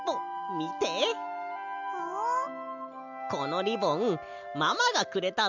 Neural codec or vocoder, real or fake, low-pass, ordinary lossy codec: none; real; 7.2 kHz; none